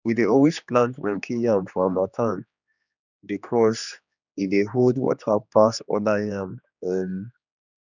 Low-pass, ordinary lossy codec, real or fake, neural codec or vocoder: 7.2 kHz; none; fake; codec, 16 kHz, 2 kbps, X-Codec, HuBERT features, trained on general audio